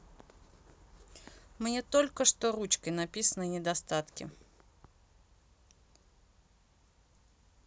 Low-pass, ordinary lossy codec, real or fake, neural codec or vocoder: none; none; real; none